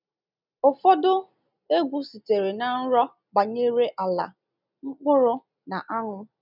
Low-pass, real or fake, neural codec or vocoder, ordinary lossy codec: 5.4 kHz; real; none; none